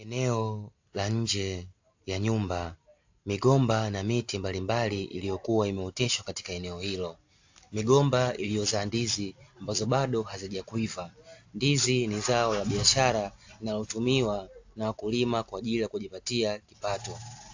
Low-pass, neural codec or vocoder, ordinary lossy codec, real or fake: 7.2 kHz; none; AAC, 48 kbps; real